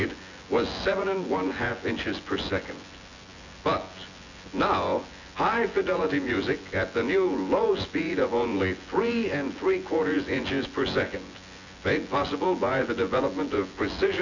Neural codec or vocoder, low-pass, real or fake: vocoder, 24 kHz, 100 mel bands, Vocos; 7.2 kHz; fake